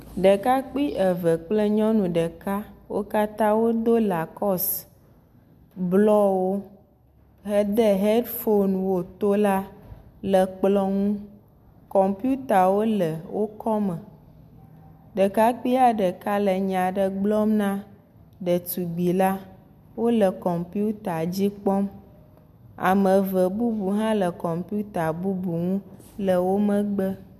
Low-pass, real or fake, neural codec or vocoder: 14.4 kHz; real; none